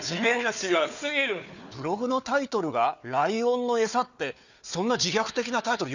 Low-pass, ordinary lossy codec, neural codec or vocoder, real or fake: 7.2 kHz; none; codec, 16 kHz, 4 kbps, FunCodec, trained on Chinese and English, 50 frames a second; fake